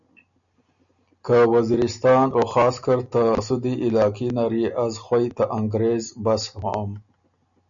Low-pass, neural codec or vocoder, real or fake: 7.2 kHz; none; real